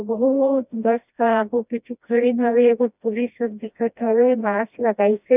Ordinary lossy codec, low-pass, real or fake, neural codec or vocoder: none; 3.6 kHz; fake; codec, 16 kHz, 1 kbps, FreqCodec, smaller model